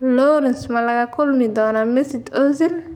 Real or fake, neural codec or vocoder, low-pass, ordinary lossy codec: fake; codec, 44.1 kHz, 7.8 kbps, DAC; 19.8 kHz; none